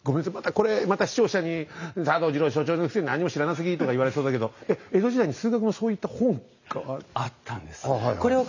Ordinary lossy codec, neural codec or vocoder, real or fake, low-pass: none; none; real; 7.2 kHz